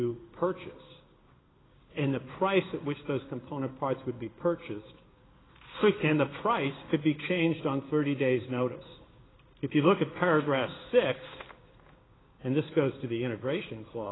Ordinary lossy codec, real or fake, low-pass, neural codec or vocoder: AAC, 16 kbps; fake; 7.2 kHz; codec, 16 kHz in and 24 kHz out, 1 kbps, XY-Tokenizer